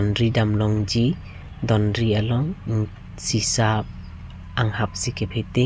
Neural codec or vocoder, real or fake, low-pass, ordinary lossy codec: none; real; none; none